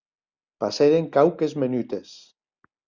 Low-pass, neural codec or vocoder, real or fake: 7.2 kHz; none; real